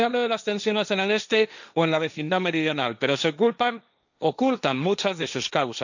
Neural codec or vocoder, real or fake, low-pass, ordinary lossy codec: codec, 16 kHz, 1.1 kbps, Voila-Tokenizer; fake; 7.2 kHz; none